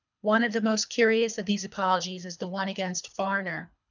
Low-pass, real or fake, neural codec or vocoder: 7.2 kHz; fake; codec, 24 kHz, 3 kbps, HILCodec